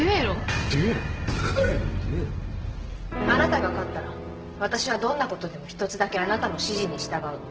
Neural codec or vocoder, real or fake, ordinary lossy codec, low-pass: none; real; Opus, 16 kbps; 7.2 kHz